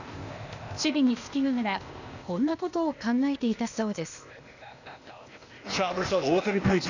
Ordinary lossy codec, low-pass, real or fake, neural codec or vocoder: none; 7.2 kHz; fake; codec, 16 kHz, 0.8 kbps, ZipCodec